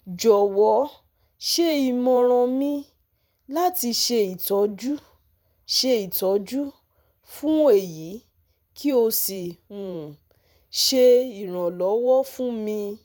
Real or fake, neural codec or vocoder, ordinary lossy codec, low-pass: fake; vocoder, 44.1 kHz, 128 mel bands every 512 samples, BigVGAN v2; none; 19.8 kHz